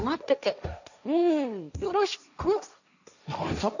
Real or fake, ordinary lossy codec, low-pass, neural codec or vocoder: fake; none; 7.2 kHz; codec, 16 kHz, 1.1 kbps, Voila-Tokenizer